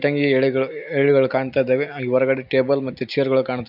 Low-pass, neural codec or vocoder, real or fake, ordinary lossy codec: 5.4 kHz; none; real; none